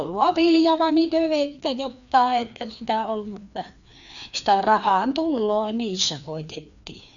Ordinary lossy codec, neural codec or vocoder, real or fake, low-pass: none; codec, 16 kHz, 2 kbps, FreqCodec, larger model; fake; 7.2 kHz